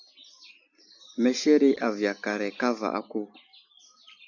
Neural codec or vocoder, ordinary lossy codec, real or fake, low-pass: none; AAC, 48 kbps; real; 7.2 kHz